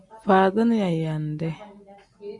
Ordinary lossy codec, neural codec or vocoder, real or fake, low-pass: AAC, 64 kbps; none; real; 10.8 kHz